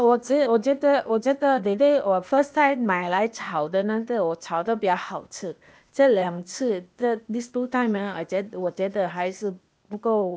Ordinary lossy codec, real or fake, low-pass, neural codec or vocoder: none; fake; none; codec, 16 kHz, 0.8 kbps, ZipCodec